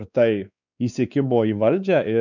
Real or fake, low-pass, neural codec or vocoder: fake; 7.2 kHz; codec, 16 kHz, 4 kbps, X-Codec, WavLM features, trained on Multilingual LibriSpeech